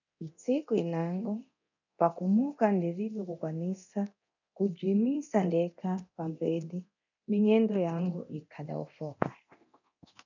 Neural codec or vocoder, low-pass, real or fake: codec, 24 kHz, 0.9 kbps, DualCodec; 7.2 kHz; fake